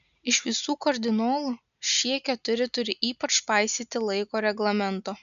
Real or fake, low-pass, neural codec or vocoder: real; 7.2 kHz; none